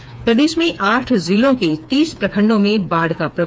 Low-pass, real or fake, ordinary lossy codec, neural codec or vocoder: none; fake; none; codec, 16 kHz, 4 kbps, FreqCodec, smaller model